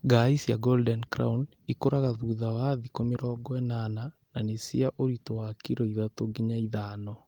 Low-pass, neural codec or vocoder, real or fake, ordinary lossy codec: 19.8 kHz; none; real; Opus, 24 kbps